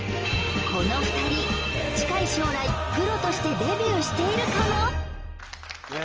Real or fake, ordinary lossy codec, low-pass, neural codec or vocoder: real; Opus, 24 kbps; 7.2 kHz; none